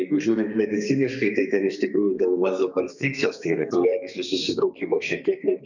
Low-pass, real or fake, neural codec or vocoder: 7.2 kHz; fake; codec, 32 kHz, 1.9 kbps, SNAC